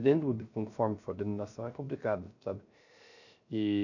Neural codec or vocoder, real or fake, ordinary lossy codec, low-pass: codec, 16 kHz, 0.3 kbps, FocalCodec; fake; none; 7.2 kHz